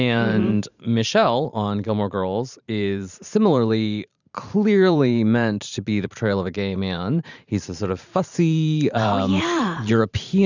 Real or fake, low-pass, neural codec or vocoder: fake; 7.2 kHz; vocoder, 44.1 kHz, 128 mel bands every 512 samples, BigVGAN v2